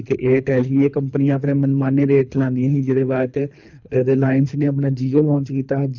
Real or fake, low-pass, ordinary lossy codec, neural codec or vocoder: fake; 7.2 kHz; none; codec, 24 kHz, 3 kbps, HILCodec